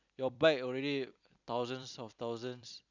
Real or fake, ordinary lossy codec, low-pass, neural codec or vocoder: fake; none; 7.2 kHz; vocoder, 44.1 kHz, 128 mel bands every 256 samples, BigVGAN v2